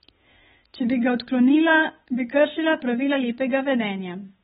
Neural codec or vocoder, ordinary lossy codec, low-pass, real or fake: codec, 16 kHz, 8 kbps, FunCodec, trained on Chinese and English, 25 frames a second; AAC, 16 kbps; 7.2 kHz; fake